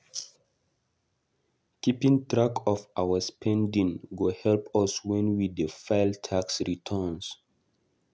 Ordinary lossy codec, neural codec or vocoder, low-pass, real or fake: none; none; none; real